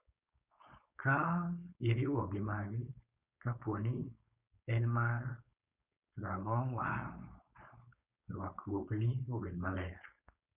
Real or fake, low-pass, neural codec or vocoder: fake; 3.6 kHz; codec, 16 kHz, 4.8 kbps, FACodec